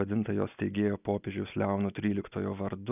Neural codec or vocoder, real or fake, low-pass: none; real; 3.6 kHz